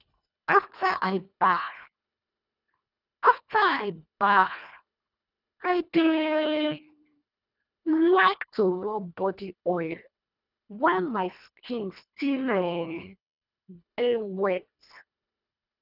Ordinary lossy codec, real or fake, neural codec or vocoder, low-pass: none; fake; codec, 24 kHz, 1.5 kbps, HILCodec; 5.4 kHz